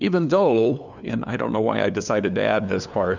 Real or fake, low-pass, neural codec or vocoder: fake; 7.2 kHz; codec, 16 kHz, 2 kbps, FunCodec, trained on LibriTTS, 25 frames a second